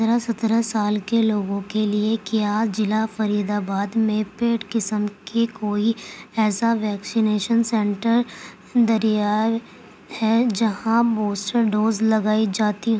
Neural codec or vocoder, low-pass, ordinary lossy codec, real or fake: none; none; none; real